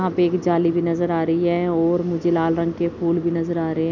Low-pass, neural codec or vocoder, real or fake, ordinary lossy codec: 7.2 kHz; none; real; none